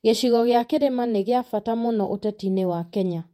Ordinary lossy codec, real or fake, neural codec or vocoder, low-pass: MP3, 64 kbps; fake; vocoder, 48 kHz, 128 mel bands, Vocos; 19.8 kHz